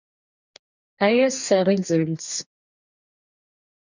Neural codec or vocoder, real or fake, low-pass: codec, 44.1 kHz, 2.6 kbps, DAC; fake; 7.2 kHz